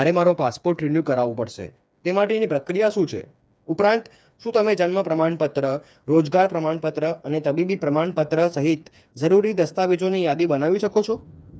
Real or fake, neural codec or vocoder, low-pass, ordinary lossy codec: fake; codec, 16 kHz, 4 kbps, FreqCodec, smaller model; none; none